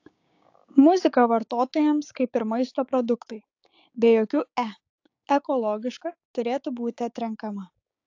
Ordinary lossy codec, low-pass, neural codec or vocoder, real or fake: AAC, 48 kbps; 7.2 kHz; codec, 16 kHz, 6 kbps, DAC; fake